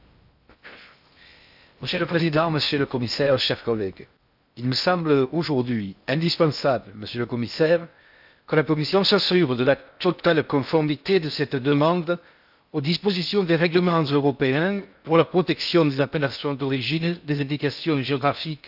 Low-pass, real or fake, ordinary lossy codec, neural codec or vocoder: 5.4 kHz; fake; AAC, 48 kbps; codec, 16 kHz in and 24 kHz out, 0.6 kbps, FocalCodec, streaming, 2048 codes